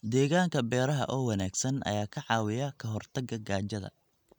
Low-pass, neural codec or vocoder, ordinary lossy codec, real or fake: 19.8 kHz; none; none; real